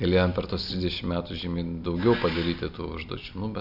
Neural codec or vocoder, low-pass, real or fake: none; 5.4 kHz; real